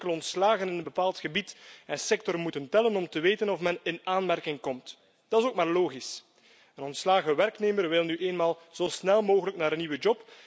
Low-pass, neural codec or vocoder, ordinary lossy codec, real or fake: none; none; none; real